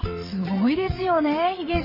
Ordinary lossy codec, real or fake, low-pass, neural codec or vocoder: MP3, 24 kbps; fake; 5.4 kHz; vocoder, 22.05 kHz, 80 mel bands, WaveNeXt